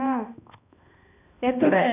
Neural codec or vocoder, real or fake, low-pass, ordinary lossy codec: codec, 16 kHz, 1 kbps, X-Codec, HuBERT features, trained on balanced general audio; fake; 3.6 kHz; none